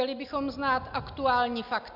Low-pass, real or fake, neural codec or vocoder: 5.4 kHz; real; none